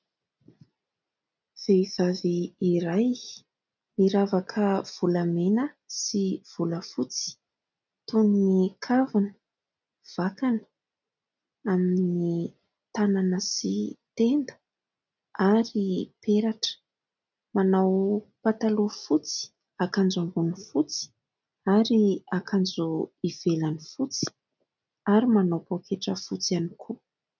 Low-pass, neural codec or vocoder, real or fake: 7.2 kHz; none; real